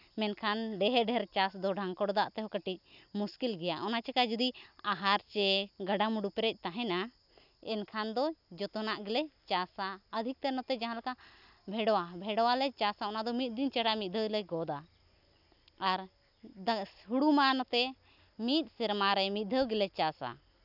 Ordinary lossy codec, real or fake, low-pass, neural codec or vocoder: none; real; 5.4 kHz; none